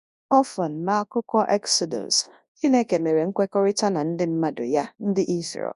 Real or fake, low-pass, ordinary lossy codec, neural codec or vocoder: fake; 10.8 kHz; none; codec, 24 kHz, 0.9 kbps, WavTokenizer, large speech release